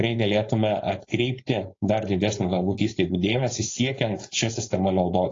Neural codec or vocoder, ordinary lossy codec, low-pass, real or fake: codec, 16 kHz, 4.8 kbps, FACodec; AAC, 32 kbps; 7.2 kHz; fake